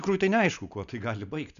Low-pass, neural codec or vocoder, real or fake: 7.2 kHz; none; real